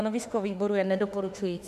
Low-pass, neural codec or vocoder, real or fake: 14.4 kHz; autoencoder, 48 kHz, 32 numbers a frame, DAC-VAE, trained on Japanese speech; fake